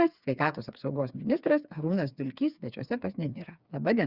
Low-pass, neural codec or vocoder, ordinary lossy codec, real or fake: 5.4 kHz; codec, 16 kHz, 4 kbps, FreqCodec, smaller model; Opus, 64 kbps; fake